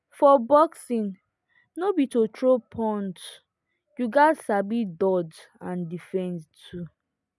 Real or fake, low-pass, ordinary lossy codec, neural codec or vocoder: real; none; none; none